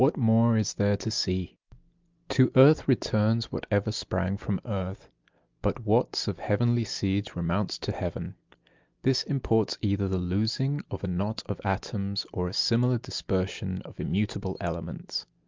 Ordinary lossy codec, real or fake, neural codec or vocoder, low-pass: Opus, 24 kbps; real; none; 7.2 kHz